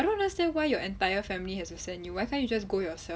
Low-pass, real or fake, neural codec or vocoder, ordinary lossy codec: none; real; none; none